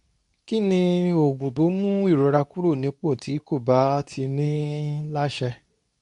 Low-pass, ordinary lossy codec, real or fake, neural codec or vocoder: 10.8 kHz; none; fake; codec, 24 kHz, 0.9 kbps, WavTokenizer, medium speech release version 2